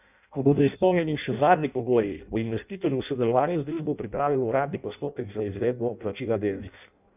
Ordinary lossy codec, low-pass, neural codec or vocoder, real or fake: none; 3.6 kHz; codec, 16 kHz in and 24 kHz out, 0.6 kbps, FireRedTTS-2 codec; fake